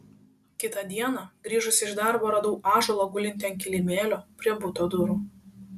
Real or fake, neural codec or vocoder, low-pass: real; none; 14.4 kHz